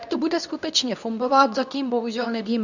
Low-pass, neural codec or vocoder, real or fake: 7.2 kHz; codec, 24 kHz, 0.9 kbps, WavTokenizer, medium speech release version 2; fake